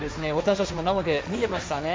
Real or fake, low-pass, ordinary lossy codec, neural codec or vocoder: fake; none; none; codec, 16 kHz, 1.1 kbps, Voila-Tokenizer